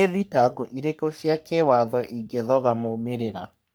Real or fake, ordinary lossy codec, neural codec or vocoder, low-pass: fake; none; codec, 44.1 kHz, 3.4 kbps, Pupu-Codec; none